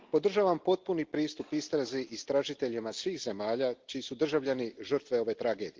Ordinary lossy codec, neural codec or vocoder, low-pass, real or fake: Opus, 16 kbps; none; 7.2 kHz; real